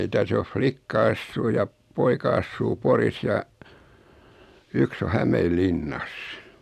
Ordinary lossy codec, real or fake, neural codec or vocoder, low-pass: none; real; none; 14.4 kHz